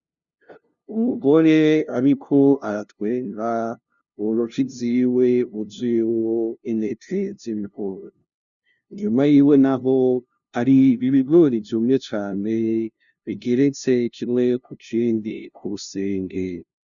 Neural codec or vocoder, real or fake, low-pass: codec, 16 kHz, 0.5 kbps, FunCodec, trained on LibriTTS, 25 frames a second; fake; 7.2 kHz